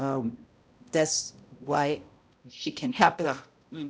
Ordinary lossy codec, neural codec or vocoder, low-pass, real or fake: none; codec, 16 kHz, 0.5 kbps, X-Codec, HuBERT features, trained on balanced general audio; none; fake